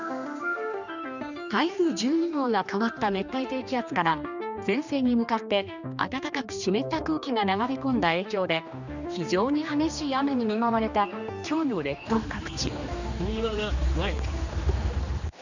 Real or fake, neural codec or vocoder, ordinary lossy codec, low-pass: fake; codec, 16 kHz, 2 kbps, X-Codec, HuBERT features, trained on general audio; none; 7.2 kHz